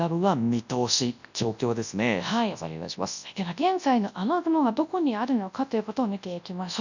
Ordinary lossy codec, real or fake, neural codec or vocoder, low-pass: none; fake; codec, 24 kHz, 0.9 kbps, WavTokenizer, large speech release; 7.2 kHz